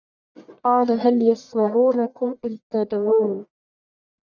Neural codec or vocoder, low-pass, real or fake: codec, 44.1 kHz, 1.7 kbps, Pupu-Codec; 7.2 kHz; fake